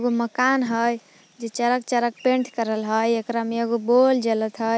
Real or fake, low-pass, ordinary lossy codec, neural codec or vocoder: real; none; none; none